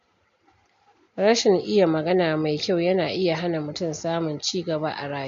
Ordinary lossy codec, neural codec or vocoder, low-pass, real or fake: MP3, 48 kbps; none; 7.2 kHz; real